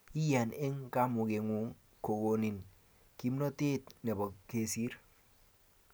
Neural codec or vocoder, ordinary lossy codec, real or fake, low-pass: none; none; real; none